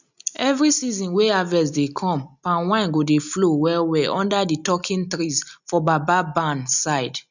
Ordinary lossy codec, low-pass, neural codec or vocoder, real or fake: none; 7.2 kHz; none; real